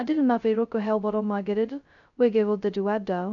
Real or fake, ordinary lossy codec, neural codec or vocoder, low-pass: fake; none; codec, 16 kHz, 0.2 kbps, FocalCodec; 7.2 kHz